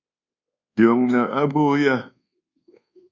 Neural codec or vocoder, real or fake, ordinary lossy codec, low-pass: codec, 24 kHz, 1.2 kbps, DualCodec; fake; Opus, 64 kbps; 7.2 kHz